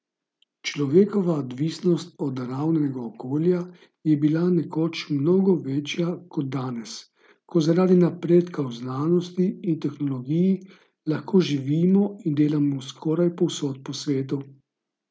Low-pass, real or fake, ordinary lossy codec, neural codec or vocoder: none; real; none; none